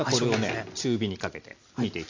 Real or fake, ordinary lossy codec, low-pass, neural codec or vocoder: real; AAC, 48 kbps; 7.2 kHz; none